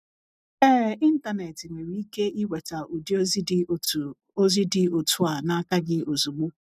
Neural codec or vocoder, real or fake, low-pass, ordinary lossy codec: none; real; 14.4 kHz; none